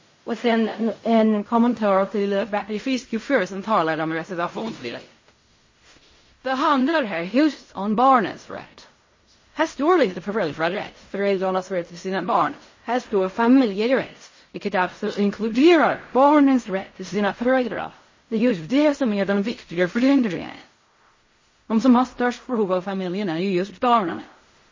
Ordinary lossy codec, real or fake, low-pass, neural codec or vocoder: MP3, 32 kbps; fake; 7.2 kHz; codec, 16 kHz in and 24 kHz out, 0.4 kbps, LongCat-Audio-Codec, fine tuned four codebook decoder